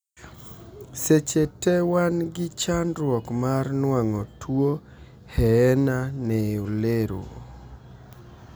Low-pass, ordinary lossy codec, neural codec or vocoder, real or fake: none; none; none; real